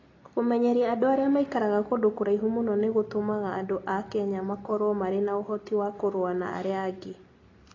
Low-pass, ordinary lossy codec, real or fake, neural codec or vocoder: 7.2 kHz; AAC, 32 kbps; real; none